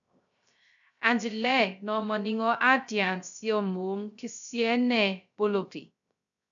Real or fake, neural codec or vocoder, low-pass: fake; codec, 16 kHz, 0.3 kbps, FocalCodec; 7.2 kHz